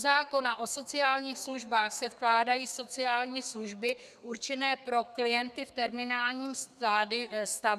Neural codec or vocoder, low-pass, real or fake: codec, 32 kHz, 1.9 kbps, SNAC; 14.4 kHz; fake